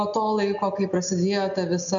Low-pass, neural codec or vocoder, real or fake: 7.2 kHz; none; real